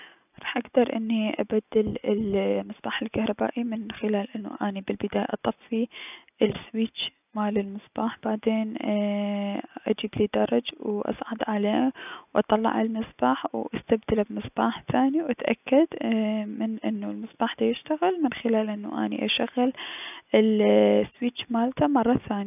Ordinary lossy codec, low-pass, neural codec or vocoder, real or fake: none; 3.6 kHz; none; real